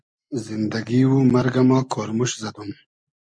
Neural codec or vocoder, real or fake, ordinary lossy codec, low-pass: none; real; MP3, 96 kbps; 9.9 kHz